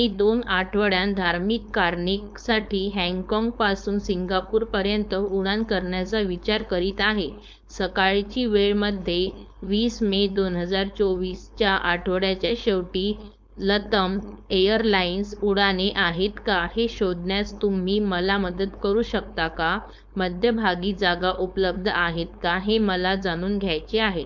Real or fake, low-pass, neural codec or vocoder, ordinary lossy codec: fake; none; codec, 16 kHz, 4.8 kbps, FACodec; none